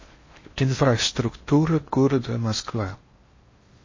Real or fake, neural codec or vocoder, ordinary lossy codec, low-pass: fake; codec, 16 kHz in and 24 kHz out, 0.6 kbps, FocalCodec, streaming, 4096 codes; MP3, 32 kbps; 7.2 kHz